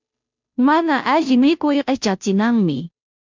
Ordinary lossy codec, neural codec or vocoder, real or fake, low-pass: MP3, 64 kbps; codec, 16 kHz, 0.5 kbps, FunCodec, trained on Chinese and English, 25 frames a second; fake; 7.2 kHz